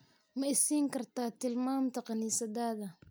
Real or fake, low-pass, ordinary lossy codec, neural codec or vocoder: real; none; none; none